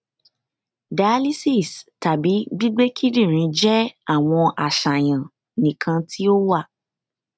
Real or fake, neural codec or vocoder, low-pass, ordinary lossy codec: real; none; none; none